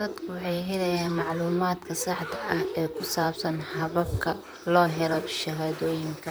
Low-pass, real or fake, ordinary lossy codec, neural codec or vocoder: none; fake; none; vocoder, 44.1 kHz, 128 mel bands, Pupu-Vocoder